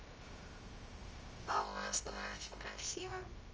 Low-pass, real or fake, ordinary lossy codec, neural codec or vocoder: 7.2 kHz; fake; Opus, 24 kbps; codec, 16 kHz, 0.3 kbps, FocalCodec